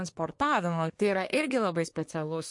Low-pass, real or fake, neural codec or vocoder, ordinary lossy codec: 10.8 kHz; fake; codec, 24 kHz, 1 kbps, SNAC; MP3, 48 kbps